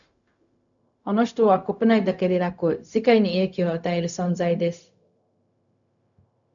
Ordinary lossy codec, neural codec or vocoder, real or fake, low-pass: MP3, 96 kbps; codec, 16 kHz, 0.4 kbps, LongCat-Audio-Codec; fake; 7.2 kHz